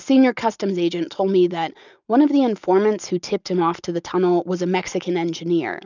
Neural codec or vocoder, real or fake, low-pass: none; real; 7.2 kHz